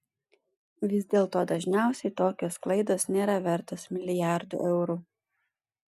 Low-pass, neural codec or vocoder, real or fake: 14.4 kHz; none; real